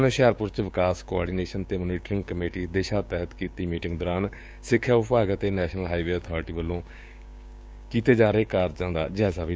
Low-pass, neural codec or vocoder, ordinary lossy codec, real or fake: none; codec, 16 kHz, 6 kbps, DAC; none; fake